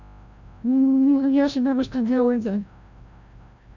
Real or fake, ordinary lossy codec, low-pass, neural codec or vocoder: fake; none; 7.2 kHz; codec, 16 kHz, 0.5 kbps, FreqCodec, larger model